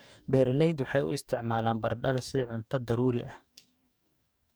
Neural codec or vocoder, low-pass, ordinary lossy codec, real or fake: codec, 44.1 kHz, 2.6 kbps, DAC; none; none; fake